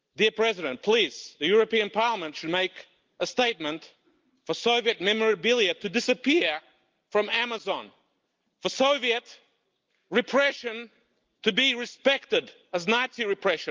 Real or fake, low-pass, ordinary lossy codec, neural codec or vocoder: real; 7.2 kHz; Opus, 24 kbps; none